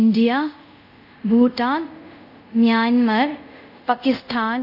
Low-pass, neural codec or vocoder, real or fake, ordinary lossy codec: 5.4 kHz; codec, 24 kHz, 0.5 kbps, DualCodec; fake; none